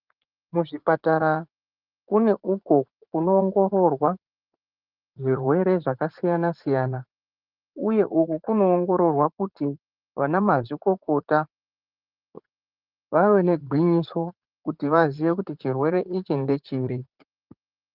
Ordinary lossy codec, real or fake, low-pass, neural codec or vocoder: Opus, 32 kbps; fake; 5.4 kHz; vocoder, 44.1 kHz, 80 mel bands, Vocos